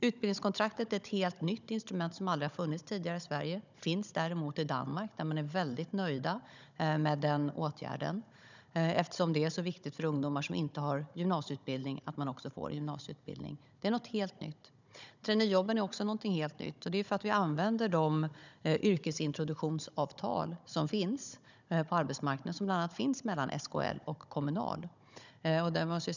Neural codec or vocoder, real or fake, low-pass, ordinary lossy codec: codec, 16 kHz, 16 kbps, FunCodec, trained on Chinese and English, 50 frames a second; fake; 7.2 kHz; none